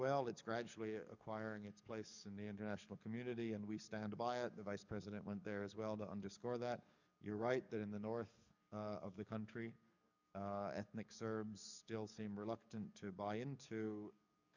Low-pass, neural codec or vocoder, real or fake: 7.2 kHz; codec, 44.1 kHz, 7.8 kbps, DAC; fake